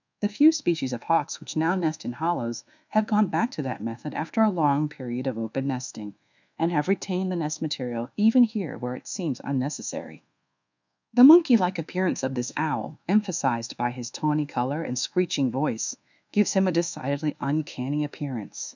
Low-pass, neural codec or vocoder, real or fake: 7.2 kHz; codec, 24 kHz, 1.2 kbps, DualCodec; fake